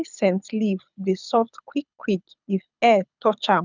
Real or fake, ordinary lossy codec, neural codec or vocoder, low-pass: fake; none; codec, 24 kHz, 6 kbps, HILCodec; 7.2 kHz